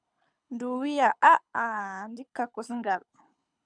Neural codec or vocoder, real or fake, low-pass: codec, 24 kHz, 6 kbps, HILCodec; fake; 9.9 kHz